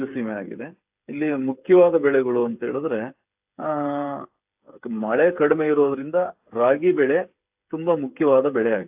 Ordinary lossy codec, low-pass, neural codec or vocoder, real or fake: AAC, 32 kbps; 3.6 kHz; codec, 16 kHz, 8 kbps, FreqCodec, smaller model; fake